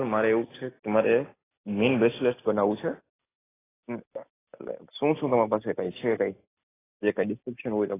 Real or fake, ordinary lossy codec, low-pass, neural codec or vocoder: real; AAC, 16 kbps; 3.6 kHz; none